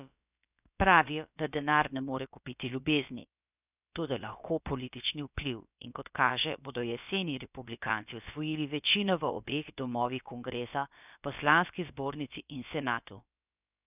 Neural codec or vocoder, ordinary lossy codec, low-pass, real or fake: codec, 16 kHz, about 1 kbps, DyCAST, with the encoder's durations; none; 3.6 kHz; fake